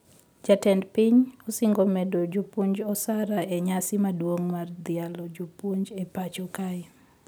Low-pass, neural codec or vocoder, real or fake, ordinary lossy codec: none; none; real; none